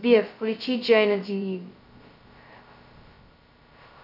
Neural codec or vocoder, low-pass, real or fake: codec, 16 kHz, 0.2 kbps, FocalCodec; 5.4 kHz; fake